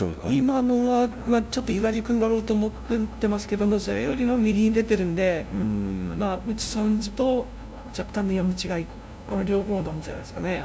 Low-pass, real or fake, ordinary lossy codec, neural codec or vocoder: none; fake; none; codec, 16 kHz, 0.5 kbps, FunCodec, trained on LibriTTS, 25 frames a second